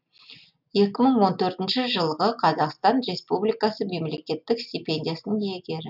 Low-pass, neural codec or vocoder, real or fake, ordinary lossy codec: 5.4 kHz; none; real; none